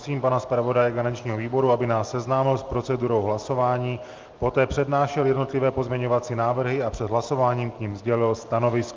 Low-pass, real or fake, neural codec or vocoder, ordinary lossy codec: 7.2 kHz; real; none; Opus, 32 kbps